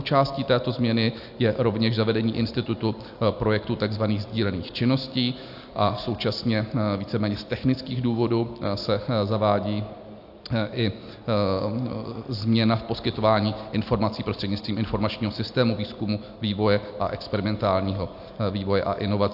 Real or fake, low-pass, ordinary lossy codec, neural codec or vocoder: real; 5.4 kHz; AAC, 48 kbps; none